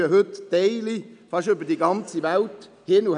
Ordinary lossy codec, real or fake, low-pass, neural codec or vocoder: none; real; 9.9 kHz; none